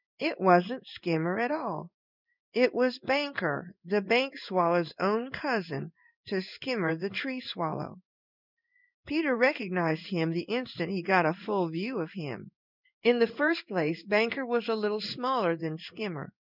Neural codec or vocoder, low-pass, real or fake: codec, 16 kHz in and 24 kHz out, 1 kbps, XY-Tokenizer; 5.4 kHz; fake